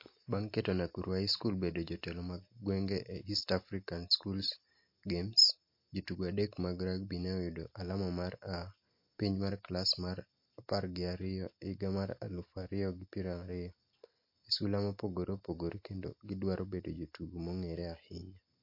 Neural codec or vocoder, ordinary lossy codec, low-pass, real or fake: none; MP3, 32 kbps; 5.4 kHz; real